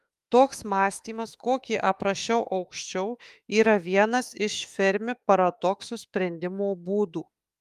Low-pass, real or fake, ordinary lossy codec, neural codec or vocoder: 14.4 kHz; fake; Opus, 32 kbps; autoencoder, 48 kHz, 32 numbers a frame, DAC-VAE, trained on Japanese speech